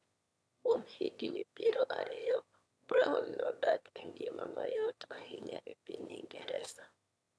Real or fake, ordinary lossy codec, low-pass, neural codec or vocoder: fake; none; none; autoencoder, 22.05 kHz, a latent of 192 numbers a frame, VITS, trained on one speaker